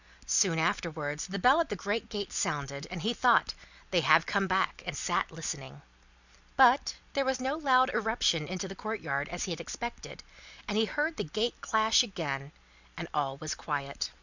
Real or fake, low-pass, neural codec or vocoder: real; 7.2 kHz; none